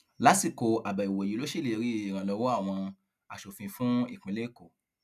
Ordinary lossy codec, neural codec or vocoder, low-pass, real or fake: none; none; 14.4 kHz; real